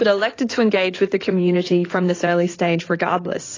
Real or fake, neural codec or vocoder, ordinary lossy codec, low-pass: fake; codec, 16 kHz in and 24 kHz out, 2.2 kbps, FireRedTTS-2 codec; AAC, 32 kbps; 7.2 kHz